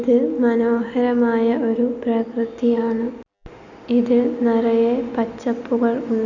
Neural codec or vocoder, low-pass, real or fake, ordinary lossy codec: none; 7.2 kHz; real; none